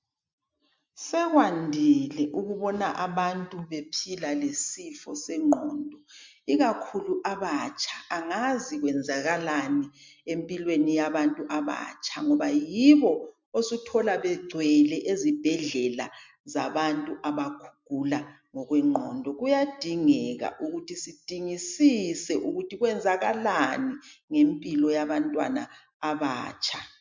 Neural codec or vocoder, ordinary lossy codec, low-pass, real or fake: none; MP3, 64 kbps; 7.2 kHz; real